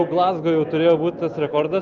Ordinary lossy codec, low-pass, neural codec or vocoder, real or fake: Opus, 32 kbps; 7.2 kHz; none; real